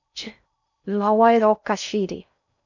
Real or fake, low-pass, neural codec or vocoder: fake; 7.2 kHz; codec, 16 kHz in and 24 kHz out, 0.6 kbps, FocalCodec, streaming, 4096 codes